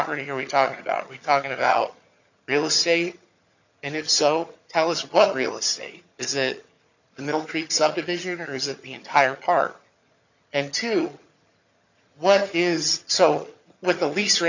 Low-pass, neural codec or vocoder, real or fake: 7.2 kHz; vocoder, 22.05 kHz, 80 mel bands, HiFi-GAN; fake